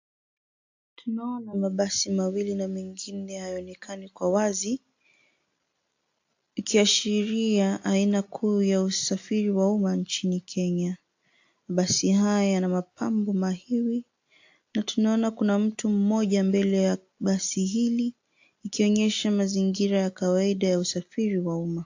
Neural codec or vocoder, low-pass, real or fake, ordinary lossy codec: none; 7.2 kHz; real; AAC, 48 kbps